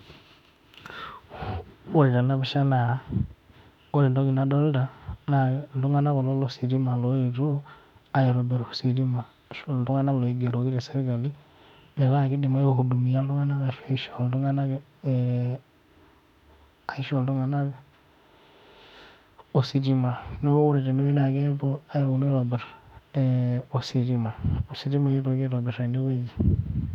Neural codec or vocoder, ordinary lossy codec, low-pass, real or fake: autoencoder, 48 kHz, 32 numbers a frame, DAC-VAE, trained on Japanese speech; none; 19.8 kHz; fake